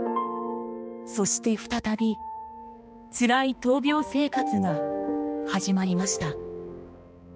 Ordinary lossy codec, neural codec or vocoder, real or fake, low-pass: none; codec, 16 kHz, 2 kbps, X-Codec, HuBERT features, trained on balanced general audio; fake; none